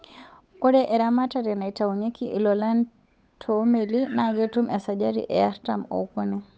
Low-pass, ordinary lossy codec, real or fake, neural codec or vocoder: none; none; fake; codec, 16 kHz, 8 kbps, FunCodec, trained on Chinese and English, 25 frames a second